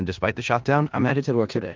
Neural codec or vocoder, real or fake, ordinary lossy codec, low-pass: codec, 16 kHz in and 24 kHz out, 0.4 kbps, LongCat-Audio-Codec, four codebook decoder; fake; Opus, 32 kbps; 7.2 kHz